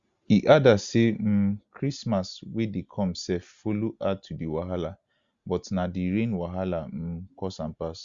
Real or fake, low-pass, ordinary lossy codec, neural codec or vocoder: real; 7.2 kHz; Opus, 64 kbps; none